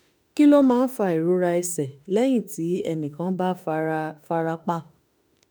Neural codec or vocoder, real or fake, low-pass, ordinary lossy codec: autoencoder, 48 kHz, 32 numbers a frame, DAC-VAE, trained on Japanese speech; fake; none; none